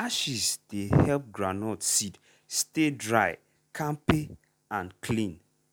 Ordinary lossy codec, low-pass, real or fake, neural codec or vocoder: none; none; real; none